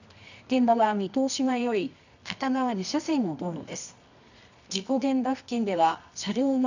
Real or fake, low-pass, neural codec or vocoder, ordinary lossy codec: fake; 7.2 kHz; codec, 24 kHz, 0.9 kbps, WavTokenizer, medium music audio release; none